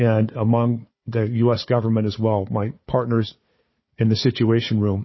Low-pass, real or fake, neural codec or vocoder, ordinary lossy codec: 7.2 kHz; fake; codec, 16 kHz, 4 kbps, FunCodec, trained on Chinese and English, 50 frames a second; MP3, 24 kbps